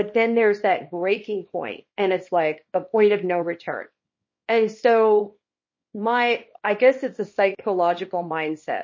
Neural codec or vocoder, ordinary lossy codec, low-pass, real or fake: codec, 24 kHz, 0.9 kbps, WavTokenizer, small release; MP3, 32 kbps; 7.2 kHz; fake